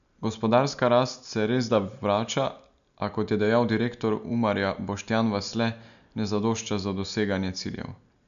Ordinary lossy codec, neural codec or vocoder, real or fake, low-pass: none; none; real; 7.2 kHz